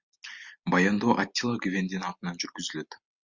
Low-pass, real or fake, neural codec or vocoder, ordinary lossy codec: 7.2 kHz; real; none; Opus, 64 kbps